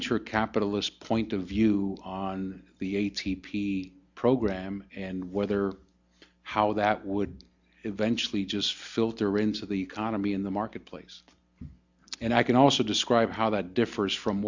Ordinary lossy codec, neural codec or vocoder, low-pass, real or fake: Opus, 64 kbps; none; 7.2 kHz; real